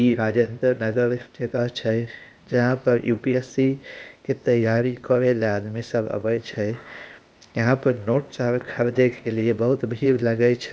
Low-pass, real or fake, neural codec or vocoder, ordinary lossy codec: none; fake; codec, 16 kHz, 0.8 kbps, ZipCodec; none